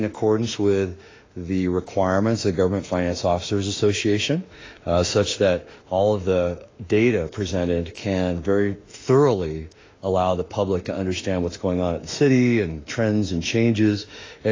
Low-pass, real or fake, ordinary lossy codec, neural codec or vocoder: 7.2 kHz; fake; AAC, 32 kbps; autoencoder, 48 kHz, 32 numbers a frame, DAC-VAE, trained on Japanese speech